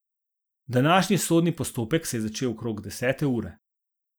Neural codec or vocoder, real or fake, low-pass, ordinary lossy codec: none; real; none; none